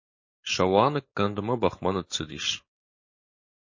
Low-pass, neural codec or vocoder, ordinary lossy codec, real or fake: 7.2 kHz; codec, 16 kHz, 4.8 kbps, FACodec; MP3, 32 kbps; fake